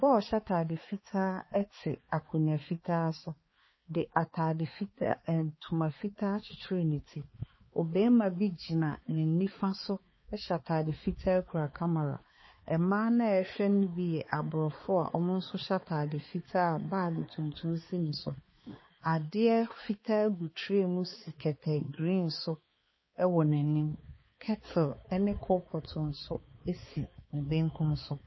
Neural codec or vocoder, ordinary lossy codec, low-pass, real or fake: codec, 16 kHz, 4 kbps, X-Codec, HuBERT features, trained on balanced general audio; MP3, 24 kbps; 7.2 kHz; fake